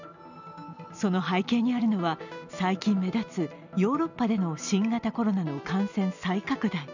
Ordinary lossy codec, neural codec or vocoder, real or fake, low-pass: none; none; real; 7.2 kHz